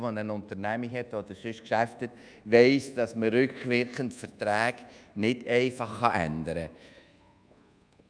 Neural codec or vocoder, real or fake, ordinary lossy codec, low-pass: codec, 24 kHz, 1.2 kbps, DualCodec; fake; none; 9.9 kHz